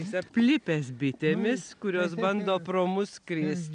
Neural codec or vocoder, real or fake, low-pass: none; real; 9.9 kHz